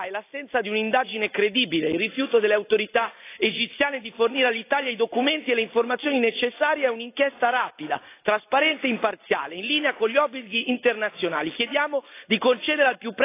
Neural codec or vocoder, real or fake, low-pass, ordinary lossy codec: none; real; 3.6 kHz; AAC, 24 kbps